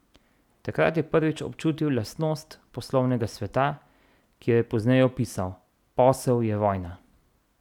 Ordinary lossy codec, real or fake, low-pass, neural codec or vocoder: none; real; 19.8 kHz; none